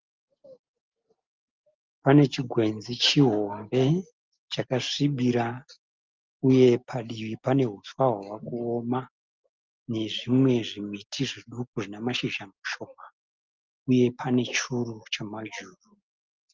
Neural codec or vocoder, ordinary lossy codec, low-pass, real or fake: none; Opus, 24 kbps; 7.2 kHz; real